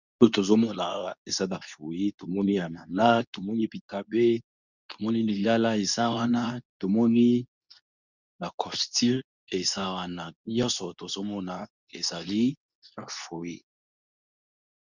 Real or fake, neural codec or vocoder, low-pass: fake; codec, 24 kHz, 0.9 kbps, WavTokenizer, medium speech release version 2; 7.2 kHz